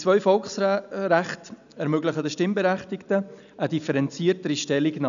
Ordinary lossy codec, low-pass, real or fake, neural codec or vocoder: none; 7.2 kHz; real; none